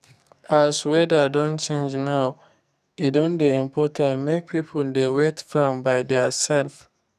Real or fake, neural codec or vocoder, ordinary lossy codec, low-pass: fake; codec, 44.1 kHz, 2.6 kbps, SNAC; none; 14.4 kHz